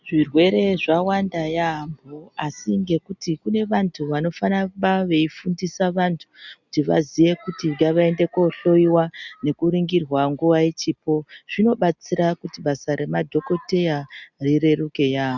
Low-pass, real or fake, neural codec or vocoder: 7.2 kHz; real; none